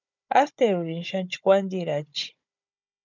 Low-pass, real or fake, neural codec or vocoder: 7.2 kHz; fake; codec, 16 kHz, 16 kbps, FunCodec, trained on Chinese and English, 50 frames a second